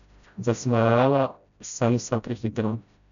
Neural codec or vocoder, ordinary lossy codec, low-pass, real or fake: codec, 16 kHz, 0.5 kbps, FreqCodec, smaller model; none; 7.2 kHz; fake